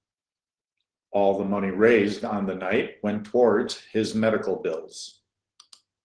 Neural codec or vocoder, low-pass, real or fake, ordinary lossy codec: none; 9.9 kHz; real; Opus, 16 kbps